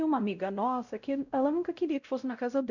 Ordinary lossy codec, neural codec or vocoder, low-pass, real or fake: none; codec, 16 kHz, 0.5 kbps, X-Codec, WavLM features, trained on Multilingual LibriSpeech; 7.2 kHz; fake